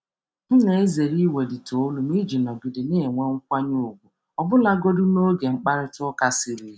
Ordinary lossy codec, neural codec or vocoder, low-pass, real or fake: none; none; none; real